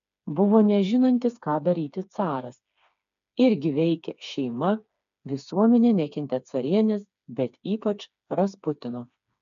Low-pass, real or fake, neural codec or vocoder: 7.2 kHz; fake; codec, 16 kHz, 4 kbps, FreqCodec, smaller model